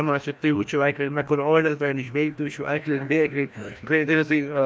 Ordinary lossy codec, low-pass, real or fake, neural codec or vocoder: none; none; fake; codec, 16 kHz, 1 kbps, FreqCodec, larger model